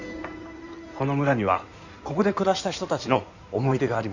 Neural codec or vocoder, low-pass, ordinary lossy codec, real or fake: codec, 16 kHz in and 24 kHz out, 2.2 kbps, FireRedTTS-2 codec; 7.2 kHz; none; fake